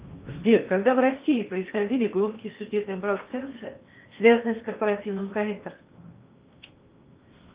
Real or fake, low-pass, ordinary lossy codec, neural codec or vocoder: fake; 3.6 kHz; Opus, 24 kbps; codec, 16 kHz in and 24 kHz out, 0.8 kbps, FocalCodec, streaming, 65536 codes